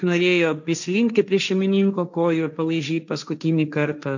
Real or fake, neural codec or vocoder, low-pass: fake; codec, 16 kHz, 1.1 kbps, Voila-Tokenizer; 7.2 kHz